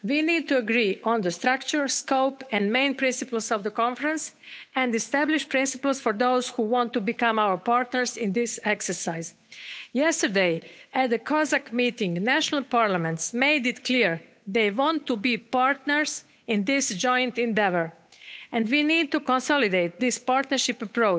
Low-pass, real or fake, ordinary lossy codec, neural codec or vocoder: none; fake; none; codec, 16 kHz, 8 kbps, FunCodec, trained on Chinese and English, 25 frames a second